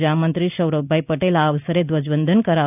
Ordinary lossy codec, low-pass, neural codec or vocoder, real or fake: none; 3.6 kHz; none; real